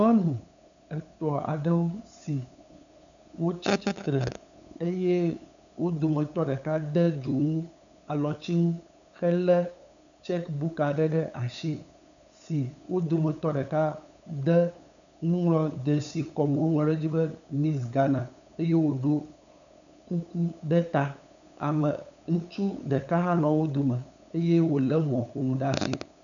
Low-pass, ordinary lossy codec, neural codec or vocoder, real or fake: 7.2 kHz; MP3, 96 kbps; codec, 16 kHz, 8 kbps, FunCodec, trained on LibriTTS, 25 frames a second; fake